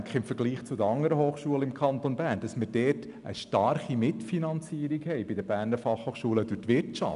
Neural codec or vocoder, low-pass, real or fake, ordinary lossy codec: none; 10.8 kHz; real; none